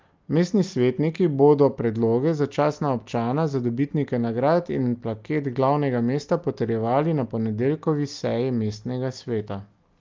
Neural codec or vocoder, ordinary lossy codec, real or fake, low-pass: none; Opus, 24 kbps; real; 7.2 kHz